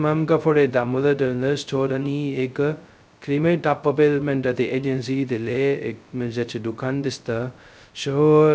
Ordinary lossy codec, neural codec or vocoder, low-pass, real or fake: none; codec, 16 kHz, 0.2 kbps, FocalCodec; none; fake